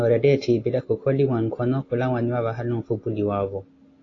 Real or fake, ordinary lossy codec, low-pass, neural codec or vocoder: real; AAC, 32 kbps; 7.2 kHz; none